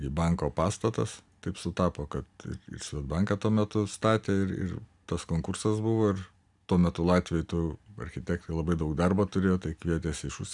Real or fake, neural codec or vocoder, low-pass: real; none; 10.8 kHz